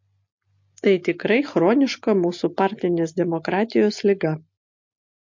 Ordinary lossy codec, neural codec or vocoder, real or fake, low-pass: MP3, 48 kbps; none; real; 7.2 kHz